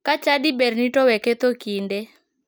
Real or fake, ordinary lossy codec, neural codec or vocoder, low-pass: real; none; none; none